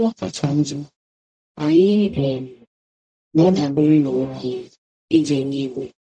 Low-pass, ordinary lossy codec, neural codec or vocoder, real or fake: 9.9 kHz; none; codec, 44.1 kHz, 0.9 kbps, DAC; fake